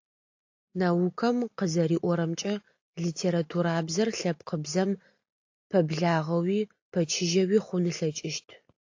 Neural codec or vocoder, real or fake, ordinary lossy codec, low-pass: none; real; AAC, 48 kbps; 7.2 kHz